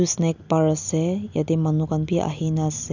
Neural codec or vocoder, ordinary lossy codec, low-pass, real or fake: none; none; 7.2 kHz; real